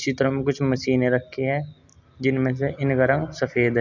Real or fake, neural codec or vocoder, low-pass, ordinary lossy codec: real; none; 7.2 kHz; none